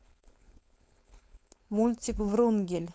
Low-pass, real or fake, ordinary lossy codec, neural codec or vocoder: none; fake; none; codec, 16 kHz, 4.8 kbps, FACodec